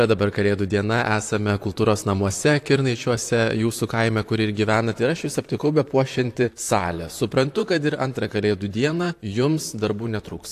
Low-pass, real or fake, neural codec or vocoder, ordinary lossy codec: 14.4 kHz; fake; vocoder, 44.1 kHz, 128 mel bands, Pupu-Vocoder; AAC, 64 kbps